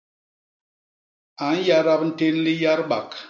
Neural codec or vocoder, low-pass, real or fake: none; 7.2 kHz; real